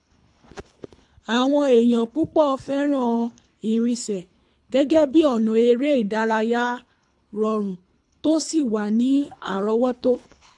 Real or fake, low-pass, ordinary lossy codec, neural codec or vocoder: fake; 10.8 kHz; AAC, 64 kbps; codec, 24 kHz, 3 kbps, HILCodec